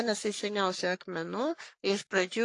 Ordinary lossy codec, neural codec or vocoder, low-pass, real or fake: AAC, 48 kbps; codec, 44.1 kHz, 3.4 kbps, Pupu-Codec; 10.8 kHz; fake